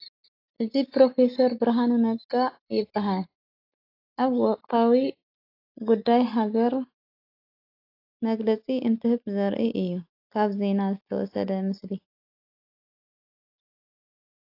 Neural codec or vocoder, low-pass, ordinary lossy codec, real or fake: none; 5.4 kHz; AAC, 32 kbps; real